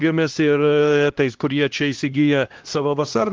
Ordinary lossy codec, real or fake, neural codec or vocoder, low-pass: Opus, 16 kbps; fake; codec, 16 kHz, 1 kbps, X-Codec, HuBERT features, trained on LibriSpeech; 7.2 kHz